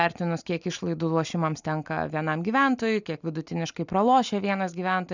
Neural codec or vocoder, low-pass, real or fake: none; 7.2 kHz; real